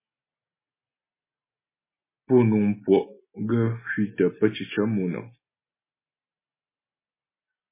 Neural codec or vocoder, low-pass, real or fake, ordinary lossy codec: none; 3.6 kHz; real; MP3, 16 kbps